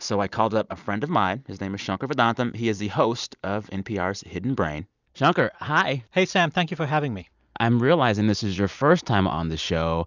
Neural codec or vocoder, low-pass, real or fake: none; 7.2 kHz; real